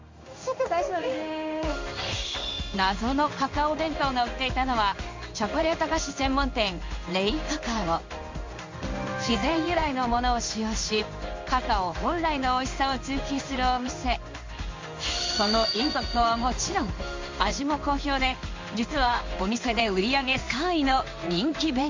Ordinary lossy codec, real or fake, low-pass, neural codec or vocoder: MP3, 48 kbps; fake; 7.2 kHz; codec, 16 kHz in and 24 kHz out, 1 kbps, XY-Tokenizer